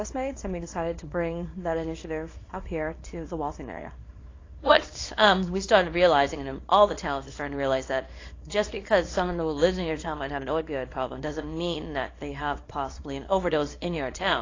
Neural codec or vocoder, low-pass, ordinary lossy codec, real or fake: codec, 24 kHz, 0.9 kbps, WavTokenizer, small release; 7.2 kHz; AAC, 32 kbps; fake